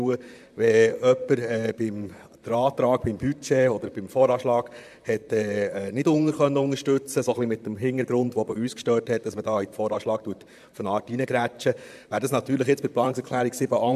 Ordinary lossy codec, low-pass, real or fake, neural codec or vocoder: MP3, 96 kbps; 14.4 kHz; fake; vocoder, 44.1 kHz, 128 mel bands, Pupu-Vocoder